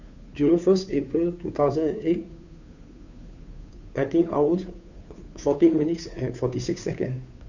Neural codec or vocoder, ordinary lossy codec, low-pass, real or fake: codec, 16 kHz, 2 kbps, FunCodec, trained on Chinese and English, 25 frames a second; none; 7.2 kHz; fake